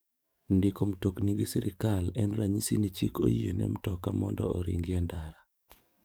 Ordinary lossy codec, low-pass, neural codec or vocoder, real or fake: none; none; codec, 44.1 kHz, 7.8 kbps, DAC; fake